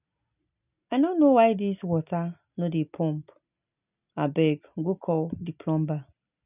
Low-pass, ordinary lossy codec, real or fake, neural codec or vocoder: 3.6 kHz; none; real; none